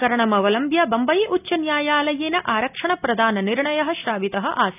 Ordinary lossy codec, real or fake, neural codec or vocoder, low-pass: none; real; none; 3.6 kHz